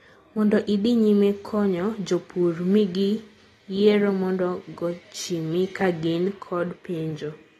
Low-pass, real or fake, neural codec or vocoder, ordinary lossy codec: 19.8 kHz; real; none; AAC, 32 kbps